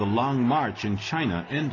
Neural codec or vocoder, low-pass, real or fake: none; 7.2 kHz; real